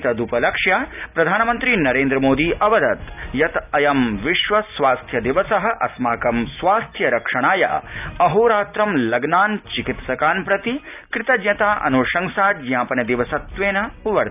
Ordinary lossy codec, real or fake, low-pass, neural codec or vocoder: none; real; 3.6 kHz; none